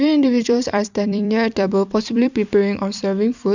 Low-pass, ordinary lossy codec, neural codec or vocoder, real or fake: 7.2 kHz; none; vocoder, 44.1 kHz, 128 mel bands every 256 samples, BigVGAN v2; fake